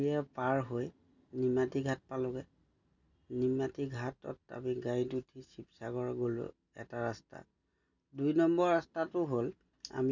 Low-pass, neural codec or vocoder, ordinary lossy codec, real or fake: 7.2 kHz; none; none; real